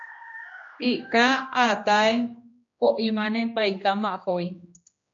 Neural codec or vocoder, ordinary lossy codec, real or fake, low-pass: codec, 16 kHz, 1 kbps, X-Codec, HuBERT features, trained on general audio; MP3, 48 kbps; fake; 7.2 kHz